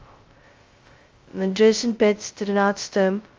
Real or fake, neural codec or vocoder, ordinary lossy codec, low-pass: fake; codec, 16 kHz, 0.2 kbps, FocalCodec; Opus, 32 kbps; 7.2 kHz